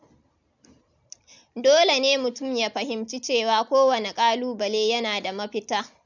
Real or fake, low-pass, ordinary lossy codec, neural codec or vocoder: real; 7.2 kHz; none; none